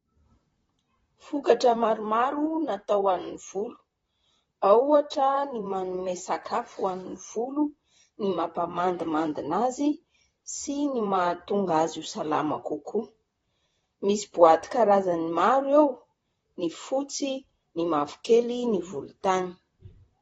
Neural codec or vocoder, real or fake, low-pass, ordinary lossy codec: vocoder, 44.1 kHz, 128 mel bands, Pupu-Vocoder; fake; 19.8 kHz; AAC, 24 kbps